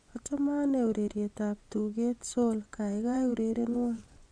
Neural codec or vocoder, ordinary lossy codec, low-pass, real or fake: vocoder, 24 kHz, 100 mel bands, Vocos; Opus, 64 kbps; 9.9 kHz; fake